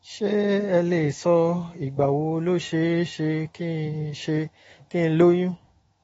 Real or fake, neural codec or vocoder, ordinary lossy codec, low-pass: fake; autoencoder, 48 kHz, 128 numbers a frame, DAC-VAE, trained on Japanese speech; AAC, 24 kbps; 19.8 kHz